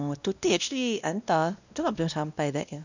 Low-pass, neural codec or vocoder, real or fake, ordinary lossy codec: 7.2 kHz; codec, 16 kHz, 1 kbps, X-Codec, WavLM features, trained on Multilingual LibriSpeech; fake; none